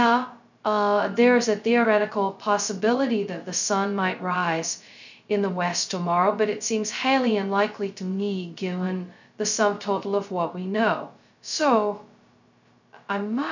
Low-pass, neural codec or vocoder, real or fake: 7.2 kHz; codec, 16 kHz, 0.2 kbps, FocalCodec; fake